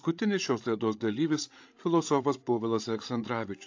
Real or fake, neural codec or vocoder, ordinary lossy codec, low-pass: fake; codec, 16 kHz, 16 kbps, FreqCodec, larger model; AAC, 48 kbps; 7.2 kHz